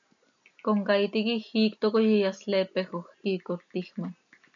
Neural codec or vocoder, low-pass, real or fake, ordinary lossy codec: none; 7.2 kHz; real; MP3, 96 kbps